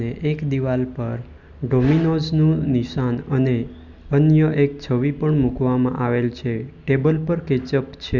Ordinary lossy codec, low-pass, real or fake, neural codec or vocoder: none; 7.2 kHz; real; none